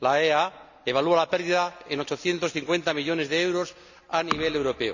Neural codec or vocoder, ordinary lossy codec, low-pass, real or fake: none; none; 7.2 kHz; real